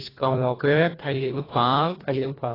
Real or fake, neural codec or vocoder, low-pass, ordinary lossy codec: fake; codec, 24 kHz, 1.5 kbps, HILCodec; 5.4 kHz; AAC, 24 kbps